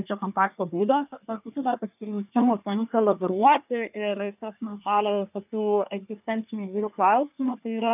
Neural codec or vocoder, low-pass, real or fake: codec, 24 kHz, 1 kbps, SNAC; 3.6 kHz; fake